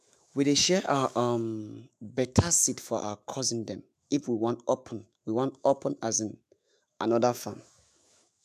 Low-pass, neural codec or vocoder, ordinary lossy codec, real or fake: 14.4 kHz; autoencoder, 48 kHz, 128 numbers a frame, DAC-VAE, trained on Japanese speech; none; fake